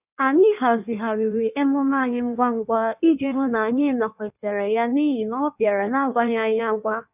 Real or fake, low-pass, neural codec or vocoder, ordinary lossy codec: fake; 3.6 kHz; codec, 16 kHz in and 24 kHz out, 1.1 kbps, FireRedTTS-2 codec; none